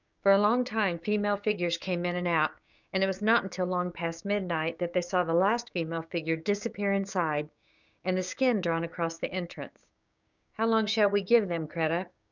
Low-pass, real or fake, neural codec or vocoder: 7.2 kHz; fake; codec, 16 kHz, 6 kbps, DAC